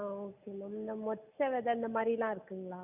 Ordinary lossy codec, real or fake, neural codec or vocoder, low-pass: none; real; none; 3.6 kHz